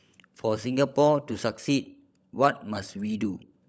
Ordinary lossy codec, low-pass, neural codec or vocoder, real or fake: none; none; codec, 16 kHz, 16 kbps, FunCodec, trained on LibriTTS, 50 frames a second; fake